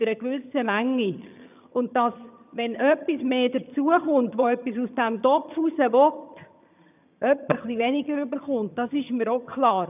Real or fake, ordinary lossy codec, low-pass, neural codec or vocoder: fake; none; 3.6 kHz; vocoder, 22.05 kHz, 80 mel bands, HiFi-GAN